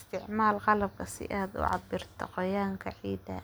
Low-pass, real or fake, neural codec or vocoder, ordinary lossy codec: none; real; none; none